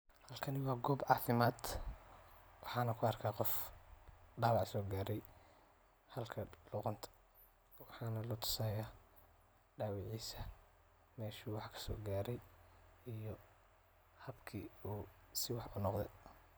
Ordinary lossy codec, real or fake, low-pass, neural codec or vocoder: none; real; none; none